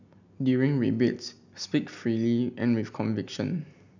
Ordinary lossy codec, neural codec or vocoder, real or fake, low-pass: none; none; real; 7.2 kHz